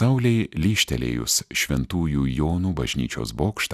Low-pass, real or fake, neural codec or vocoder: 14.4 kHz; real; none